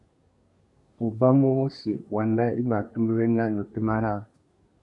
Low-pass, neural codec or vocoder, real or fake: 10.8 kHz; codec, 24 kHz, 1 kbps, SNAC; fake